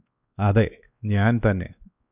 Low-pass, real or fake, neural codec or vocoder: 3.6 kHz; fake; codec, 16 kHz, 2 kbps, X-Codec, WavLM features, trained on Multilingual LibriSpeech